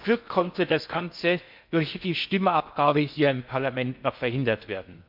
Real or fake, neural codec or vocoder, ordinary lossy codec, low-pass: fake; codec, 16 kHz in and 24 kHz out, 0.6 kbps, FocalCodec, streaming, 4096 codes; MP3, 48 kbps; 5.4 kHz